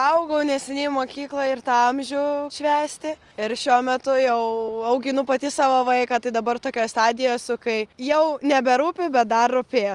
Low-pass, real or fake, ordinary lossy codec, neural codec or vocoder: 10.8 kHz; real; Opus, 24 kbps; none